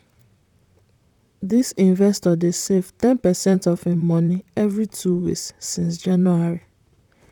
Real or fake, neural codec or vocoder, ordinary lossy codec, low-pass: fake; vocoder, 44.1 kHz, 128 mel bands, Pupu-Vocoder; none; 19.8 kHz